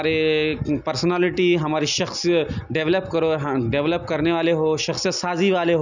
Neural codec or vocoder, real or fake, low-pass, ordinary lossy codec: none; real; 7.2 kHz; none